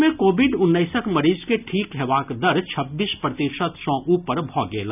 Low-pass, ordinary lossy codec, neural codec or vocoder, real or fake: 3.6 kHz; none; none; real